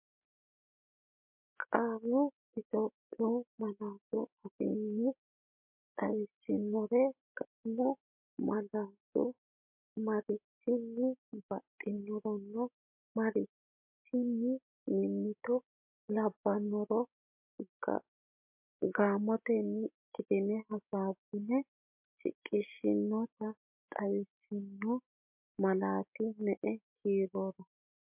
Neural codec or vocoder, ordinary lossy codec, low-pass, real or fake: none; MP3, 32 kbps; 3.6 kHz; real